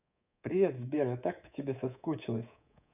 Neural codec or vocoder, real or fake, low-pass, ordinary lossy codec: codec, 24 kHz, 3.1 kbps, DualCodec; fake; 3.6 kHz; none